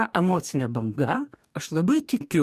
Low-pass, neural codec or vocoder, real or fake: 14.4 kHz; codec, 44.1 kHz, 2.6 kbps, DAC; fake